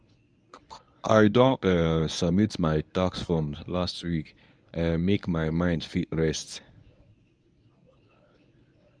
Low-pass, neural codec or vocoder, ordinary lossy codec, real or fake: 9.9 kHz; codec, 24 kHz, 0.9 kbps, WavTokenizer, medium speech release version 1; none; fake